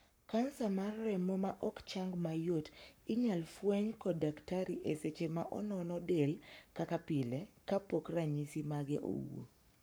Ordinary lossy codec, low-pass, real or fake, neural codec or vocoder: none; none; fake; codec, 44.1 kHz, 7.8 kbps, Pupu-Codec